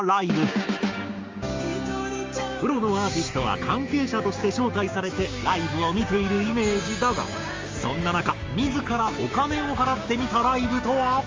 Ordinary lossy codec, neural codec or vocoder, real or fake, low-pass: Opus, 32 kbps; codec, 16 kHz, 6 kbps, DAC; fake; 7.2 kHz